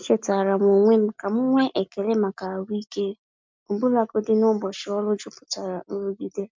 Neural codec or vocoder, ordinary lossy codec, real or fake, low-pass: none; MP3, 64 kbps; real; 7.2 kHz